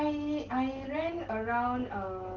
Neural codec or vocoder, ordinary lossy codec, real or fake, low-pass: none; Opus, 16 kbps; real; 7.2 kHz